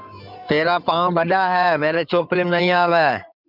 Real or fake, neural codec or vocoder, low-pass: fake; codec, 16 kHz in and 24 kHz out, 2.2 kbps, FireRedTTS-2 codec; 5.4 kHz